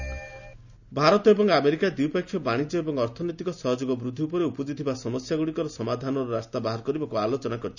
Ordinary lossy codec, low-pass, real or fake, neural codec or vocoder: none; 7.2 kHz; real; none